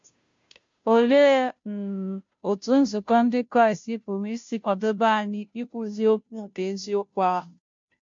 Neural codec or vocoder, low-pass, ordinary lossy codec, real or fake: codec, 16 kHz, 0.5 kbps, FunCodec, trained on Chinese and English, 25 frames a second; 7.2 kHz; MP3, 48 kbps; fake